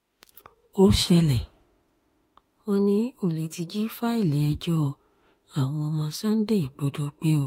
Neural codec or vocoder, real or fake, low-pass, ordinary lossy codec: autoencoder, 48 kHz, 32 numbers a frame, DAC-VAE, trained on Japanese speech; fake; 19.8 kHz; AAC, 48 kbps